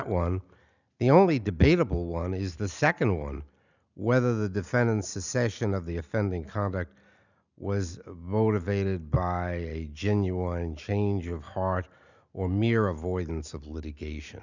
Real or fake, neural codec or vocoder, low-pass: real; none; 7.2 kHz